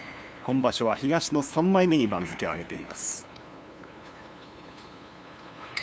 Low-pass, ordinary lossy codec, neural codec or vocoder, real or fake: none; none; codec, 16 kHz, 2 kbps, FunCodec, trained on LibriTTS, 25 frames a second; fake